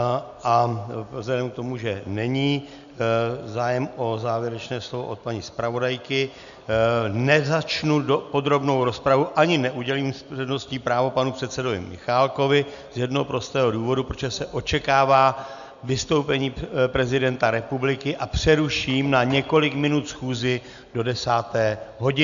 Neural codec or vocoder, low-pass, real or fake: none; 7.2 kHz; real